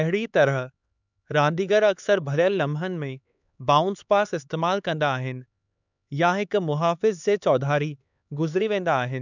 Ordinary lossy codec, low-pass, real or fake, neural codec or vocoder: none; 7.2 kHz; fake; codec, 16 kHz, 4 kbps, X-Codec, HuBERT features, trained on LibriSpeech